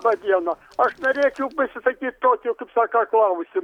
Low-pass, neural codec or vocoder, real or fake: 19.8 kHz; none; real